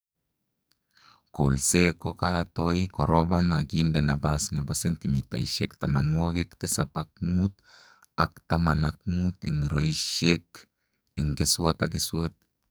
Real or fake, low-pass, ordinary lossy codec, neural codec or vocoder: fake; none; none; codec, 44.1 kHz, 2.6 kbps, SNAC